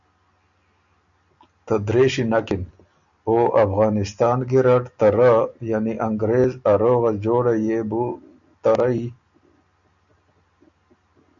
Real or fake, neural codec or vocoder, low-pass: real; none; 7.2 kHz